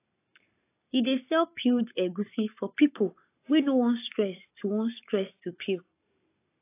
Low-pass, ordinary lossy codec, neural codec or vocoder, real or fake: 3.6 kHz; AAC, 24 kbps; codec, 44.1 kHz, 7.8 kbps, Pupu-Codec; fake